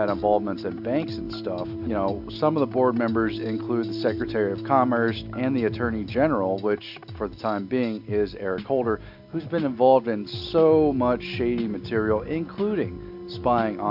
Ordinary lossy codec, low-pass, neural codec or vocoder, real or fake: AAC, 48 kbps; 5.4 kHz; none; real